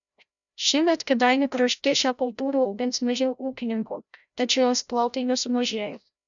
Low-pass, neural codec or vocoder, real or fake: 7.2 kHz; codec, 16 kHz, 0.5 kbps, FreqCodec, larger model; fake